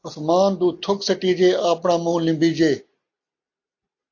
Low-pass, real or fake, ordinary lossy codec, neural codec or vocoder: 7.2 kHz; real; AAC, 48 kbps; none